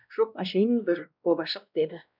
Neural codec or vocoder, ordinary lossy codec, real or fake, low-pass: codec, 16 kHz, 1 kbps, X-Codec, WavLM features, trained on Multilingual LibriSpeech; none; fake; 5.4 kHz